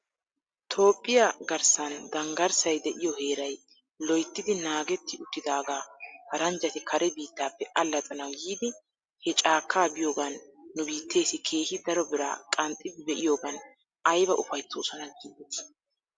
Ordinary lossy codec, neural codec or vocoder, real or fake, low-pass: Opus, 64 kbps; none; real; 9.9 kHz